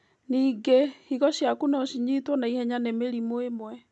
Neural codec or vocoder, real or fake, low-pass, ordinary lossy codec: none; real; none; none